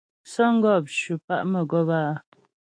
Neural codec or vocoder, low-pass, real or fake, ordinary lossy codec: autoencoder, 48 kHz, 128 numbers a frame, DAC-VAE, trained on Japanese speech; 9.9 kHz; fake; AAC, 48 kbps